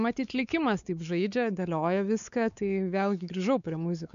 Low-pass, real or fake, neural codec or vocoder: 7.2 kHz; fake; codec, 16 kHz, 4 kbps, X-Codec, WavLM features, trained on Multilingual LibriSpeech